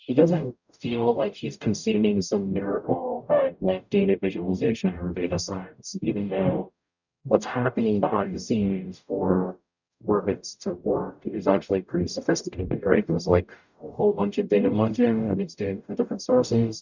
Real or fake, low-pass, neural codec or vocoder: fake; 7.2 kHz; codec, 44.1 kHz, 0.9 kbps, DAC